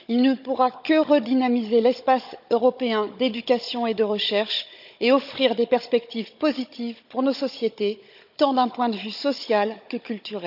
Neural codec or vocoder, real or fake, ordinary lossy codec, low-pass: codec, 16 kHz, 16 kbps, FunCodec, trained on Chinese and English, 50 frames a second; fake; none; 5.4 kHz